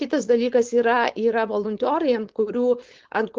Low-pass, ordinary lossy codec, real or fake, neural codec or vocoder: 7.2 kHz; Opus, 16 kbps; fake; codec, 16 kHz, 4.8 kbps, FACodec